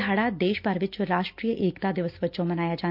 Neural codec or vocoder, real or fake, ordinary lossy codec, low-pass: none; real; MP3, 48 kbps; 5.4 kHz